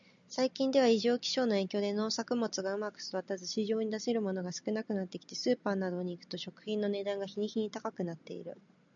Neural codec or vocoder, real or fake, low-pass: none; real; 7.2 kHz